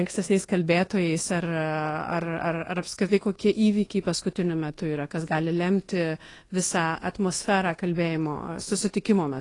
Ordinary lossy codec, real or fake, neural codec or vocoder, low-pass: AAC, 32 kbps; fake; codec, 24 kHz, 1.2 kbps, DualCodec; 10.8 kHz